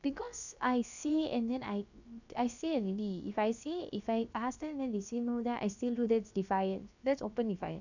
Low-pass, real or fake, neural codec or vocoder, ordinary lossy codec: 7.2 kHz; fake; codec, 16 kHz, about 1 kbps, DyCAST, with the encoder's durations; none